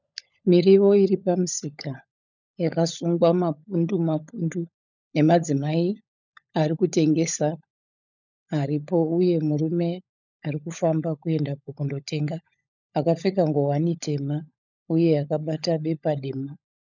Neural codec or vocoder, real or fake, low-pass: codec, 16 kHz, 16 kbps, FunCodec, trained on LibriTTS, 50 frames a second; fake; 7.2 kHz